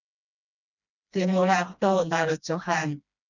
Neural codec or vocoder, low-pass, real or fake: codec, 16 kHz, 1 kbps, FreqCodec, smaller model; 7.2 kHz; fake